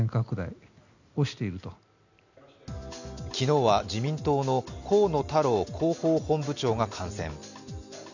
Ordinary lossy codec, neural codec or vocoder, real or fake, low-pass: none; none; real; 7.2 kHz